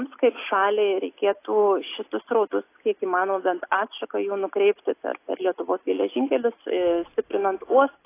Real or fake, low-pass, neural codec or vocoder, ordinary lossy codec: real; 3.6 kHz; none; AAC, 24 kbps